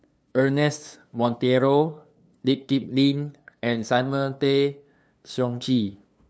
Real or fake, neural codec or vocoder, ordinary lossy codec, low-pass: fake; codec, 16 kHz, 2 kbps, FunCodec, trained on LibriTTS, 25 frames a second; none; none